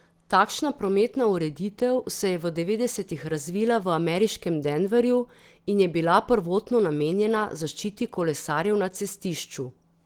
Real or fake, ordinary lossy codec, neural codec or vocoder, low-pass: real; Opus, 16 kbps; none; 19.8 kHz